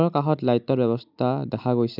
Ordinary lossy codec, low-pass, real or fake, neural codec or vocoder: none; 5.4 kHz; real; none